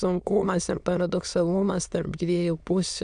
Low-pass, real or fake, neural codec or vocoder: 9.9 kHz; fake; autoencoder, 22.05 kHz, a latent of 192 numbers a frame, VITS, trained on many speakers